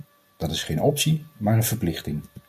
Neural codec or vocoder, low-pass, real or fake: none; 14.4 kHz; real